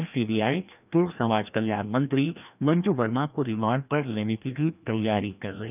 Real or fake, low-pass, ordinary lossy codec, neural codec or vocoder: fake; 3.6 kHz; none; codec, 16 kHz, 1 kbps, FreqCodec, larger model